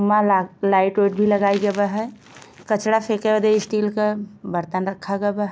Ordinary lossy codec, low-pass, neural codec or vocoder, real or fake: none; none; none; real